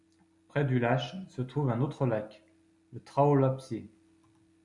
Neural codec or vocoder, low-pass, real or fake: none; 10.8 kHz; real